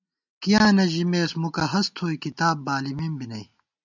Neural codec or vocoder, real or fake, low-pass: none; real; 7.2 kHz